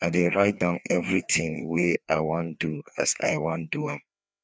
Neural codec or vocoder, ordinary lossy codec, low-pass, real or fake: codec, 16 kHz, 2 kbps, FreqCodec, larger model; none; none; fake